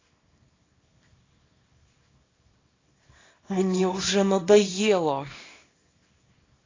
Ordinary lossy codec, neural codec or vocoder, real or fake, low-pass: AAC, 32 kbps; codec, 24 kHz, 0.9 kbps, WavTokenizer, small release; fake; 7.2 kHz